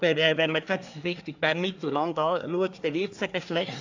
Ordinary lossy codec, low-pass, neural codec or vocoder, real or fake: AAC, 48 kbps; 7.2 kHz; codec, 24 kHz, 1 kbps, SNAC; fake